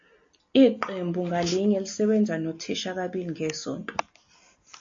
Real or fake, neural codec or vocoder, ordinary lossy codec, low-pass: real; none; AAC, 48 kbps; 7.2 kHz